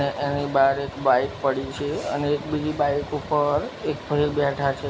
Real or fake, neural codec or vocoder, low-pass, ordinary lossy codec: real; none; none; none